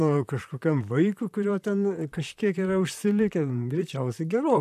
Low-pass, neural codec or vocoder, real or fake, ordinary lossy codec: 14.4 kHz; vocoder, 44.1 kHz, 128 mel bands, Pupu-Vocoder; fake; AAC, 96 kbps